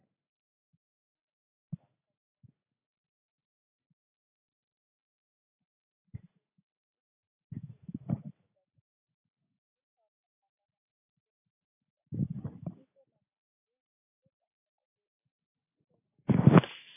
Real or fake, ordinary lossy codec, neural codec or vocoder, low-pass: real; AAC, 24 kbps; none; 3.6 kHz